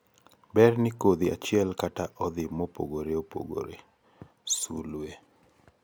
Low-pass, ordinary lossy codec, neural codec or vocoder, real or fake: none; none; none; real